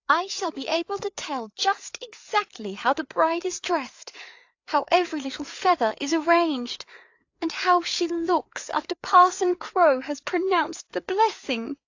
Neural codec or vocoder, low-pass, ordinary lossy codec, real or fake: codec, 16 kHz, 4 kbps, FreqCodec, larger model; 7.2 kHz; AAC, 48 kbps; fake